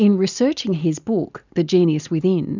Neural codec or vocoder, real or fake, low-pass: none; real; 7.2 kHz